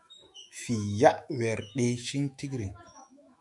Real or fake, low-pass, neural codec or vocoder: fake; 10.8 kHz; autoencoder, 48 kHz, 128 numbers a frame, DAC-VAE, trained on Japanese speech